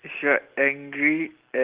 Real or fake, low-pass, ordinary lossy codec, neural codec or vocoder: real; 3.6 kHz; Opus, 16 kbps; none